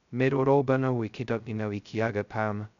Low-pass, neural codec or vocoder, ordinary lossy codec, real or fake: 7.2 kHz; codec, 16 kHz, 0.2 kbps, FocalCodec; none; fake